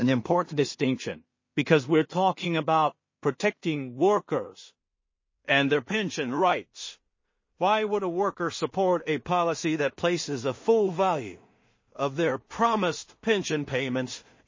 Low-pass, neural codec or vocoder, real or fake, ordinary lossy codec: 7.2 kHz; codec, 16 kHz in and 24 kHz out, 0.4 kbps, LongCat-Audio-Codec, two codebook decoder; fake; MP3, 32 kbps